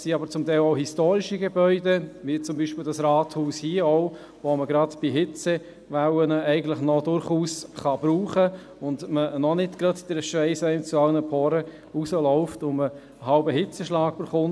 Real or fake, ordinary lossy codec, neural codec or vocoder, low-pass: real; none; none; none